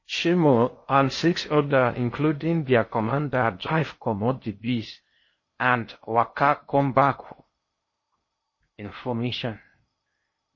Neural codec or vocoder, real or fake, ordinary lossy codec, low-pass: codec, 16 kHz in and 24 kHz out, 0.6 kbps, FocalCodec, streaming, 2048 codes; fake; MP3, 32 kbps; 7.2 kHz